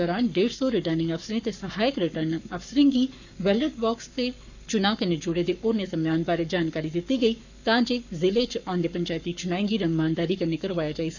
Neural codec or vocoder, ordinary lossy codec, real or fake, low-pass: codec, 44.1 kHz, 7.8 kbps, Pupu-Codec; none; fake; 7.2 kHz